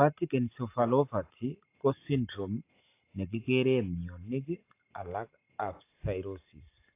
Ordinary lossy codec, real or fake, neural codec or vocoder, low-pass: AAC, 24 kbps; real; none; 3.6 kHz